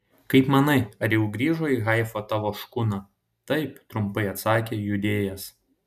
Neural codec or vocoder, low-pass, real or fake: none; 14.4 kHz; real